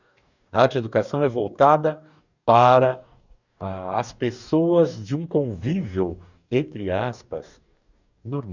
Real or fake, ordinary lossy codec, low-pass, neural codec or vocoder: fake; none; 7.2 kHz; codec, 44.1 kHz, 2.6 kbps, DAC